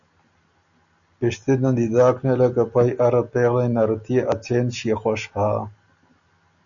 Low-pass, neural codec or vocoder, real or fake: 7.2 kHz; none; real